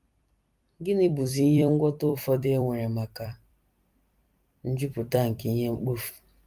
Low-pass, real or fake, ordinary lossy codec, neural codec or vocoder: 14.4 kHz; fake; Opus, 32 kbps; vocoder, 44.1 kHz, 128 mel bands every 256 samples, BigVGAN v2